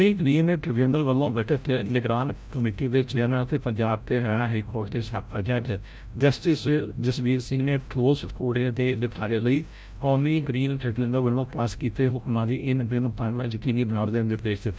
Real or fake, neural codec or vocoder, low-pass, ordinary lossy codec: fake; codec, 16 kHz, 0.5 kbps, FreqCodec, larger model; none; none